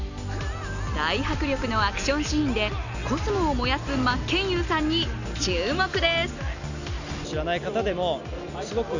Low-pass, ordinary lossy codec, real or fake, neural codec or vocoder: 7.2 kHz; none; real; none